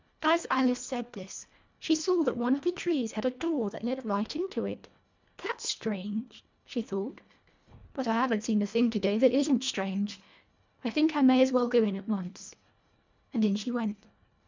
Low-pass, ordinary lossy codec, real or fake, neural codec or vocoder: 7.2 kHz; MP3, 64 kbps; fake; codec, 24 kHz, 1.5 kbps, HILCodec